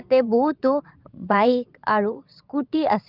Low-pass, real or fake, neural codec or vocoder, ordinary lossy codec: 5.4 kHz; fake; vocoder, 22.05 kHz, 80 mel bands, Vocos; Opus, 24 kbps